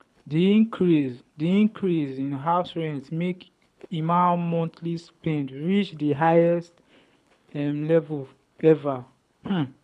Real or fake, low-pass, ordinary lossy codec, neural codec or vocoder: fake; none; none; codec, 24 kHz, 6 kbps, HILCodec